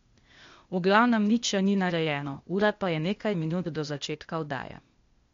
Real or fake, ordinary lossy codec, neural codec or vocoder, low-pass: fake; MP3, 48 kbps; codec, 16 kHz, 0.8 kbps, ZipCodec; 7.2 kHz